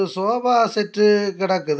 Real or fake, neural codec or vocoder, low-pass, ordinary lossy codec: real; none; none; none